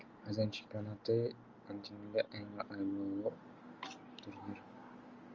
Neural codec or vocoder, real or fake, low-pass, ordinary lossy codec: none; real; 7.2 kHz; Opus, 32 kbps